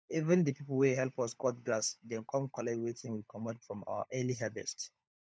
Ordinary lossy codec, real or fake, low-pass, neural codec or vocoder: none; fake; none; codec, 16 kHz, 16 kbps, FunCodec, trained on LibriTTS, 50 frames a second